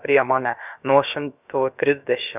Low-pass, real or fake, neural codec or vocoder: 3.6 kHz; fake; codec, 16 kHz, about 1 kbps, DyCAST, with the encoder's durations